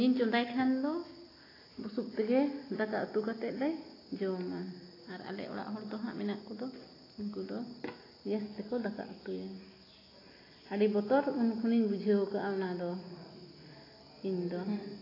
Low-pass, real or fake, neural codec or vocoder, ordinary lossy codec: 5.4 kHz; real; none; AAC, 24 kbps